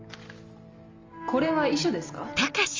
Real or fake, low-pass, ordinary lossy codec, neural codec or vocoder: real; 7.2 kHz; Opus, 32 kbps; none